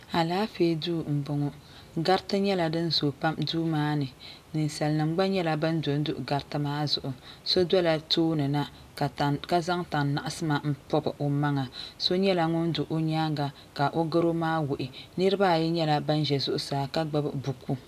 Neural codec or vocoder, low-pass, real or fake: none; 14.4 kHz; real